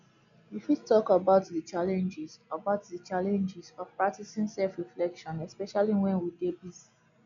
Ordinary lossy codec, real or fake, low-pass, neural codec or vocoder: none; real; 7.2 kHz; none